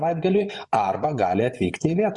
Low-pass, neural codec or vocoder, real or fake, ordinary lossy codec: 10.8 kHz; vocoder, 24 kHz, 100 mel bands, Vocos; fake; Opus, 64 kbps